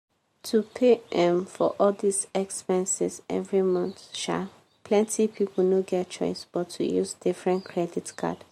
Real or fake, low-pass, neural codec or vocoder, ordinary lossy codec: real; 19.8 kHz; none; MP3, 64 kbps